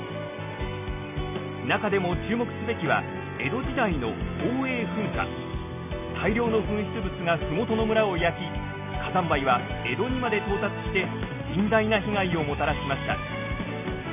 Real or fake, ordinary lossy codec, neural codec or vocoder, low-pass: real; none; none; 3.6 kHz